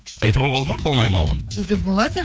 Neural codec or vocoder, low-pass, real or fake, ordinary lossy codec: codec, 16 kHz, 2 kbps, FreqCodec, larger model; none; fake; none